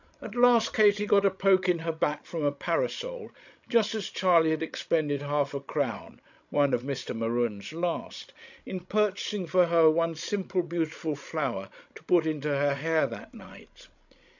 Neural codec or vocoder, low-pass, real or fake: codec, 16 kHz, 16 kbps, FreqCodec, larger model; 7.2 kHz; fake